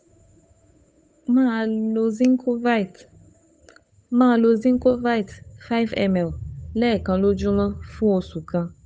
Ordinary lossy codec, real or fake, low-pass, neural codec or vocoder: none; fake; none; codec, 16 kHz, 8 kbps, FunCodec, trained on Chinese and English, 25 frames a second